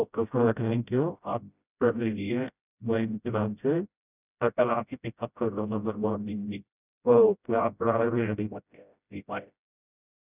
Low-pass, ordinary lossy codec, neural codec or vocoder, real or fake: 3.6 kHz; none; codec, 16 kHz, 0.5 kbps, FreqCodec, smaller model; fake